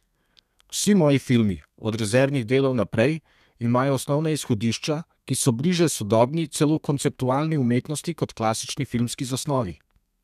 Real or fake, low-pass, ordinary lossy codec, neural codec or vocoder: fake; 14.4 kHz; none; codec, 32 kHz, 1.9 kbps, SNAC